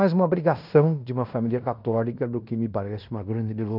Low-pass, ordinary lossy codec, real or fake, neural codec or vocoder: 5.4 kHz; none; fake; codec, 16 kHz in and 24 kHz out, 0.9 kbps, LongCat-Audio-Codec, fine tuned four codebook decoder